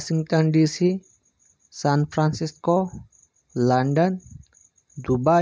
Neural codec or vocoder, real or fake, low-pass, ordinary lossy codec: none; real; none; none